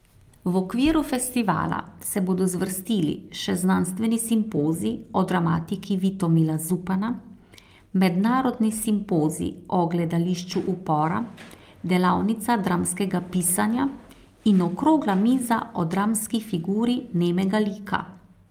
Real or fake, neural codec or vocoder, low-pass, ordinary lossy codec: real; none; 19.8 kHz; Opus, 32 kbps